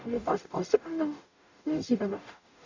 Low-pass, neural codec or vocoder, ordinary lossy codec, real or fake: 7.2 kHz; codec, 44.1 kHz, 0.9 kbps, DAC; AAC, 48 kbps; fake